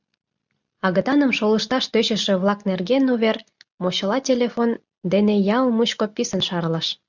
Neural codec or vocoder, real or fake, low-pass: none; real; 7.2 kHz